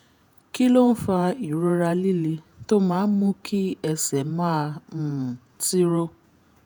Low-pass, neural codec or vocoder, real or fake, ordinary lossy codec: none; vocoder, 48 kHz, 128 mel bands, Vocos; fake; none